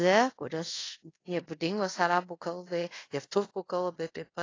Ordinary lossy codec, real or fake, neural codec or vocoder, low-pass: AAC, 32 kbps; fake; codec, 24 kHz, 0.5 kbps, DualCodec; 7.2 kHz